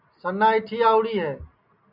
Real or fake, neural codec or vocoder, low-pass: real; none; 5.4 kHz